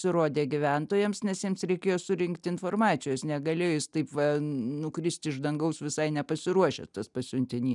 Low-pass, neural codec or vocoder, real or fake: 10.8 kHz; none; real